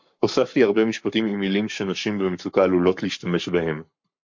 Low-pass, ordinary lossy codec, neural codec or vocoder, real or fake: 7.2 kHz; MP3, 48 kbps; codec, 44.1 kHz, 7.8 kbps, Pupu-Codec; fake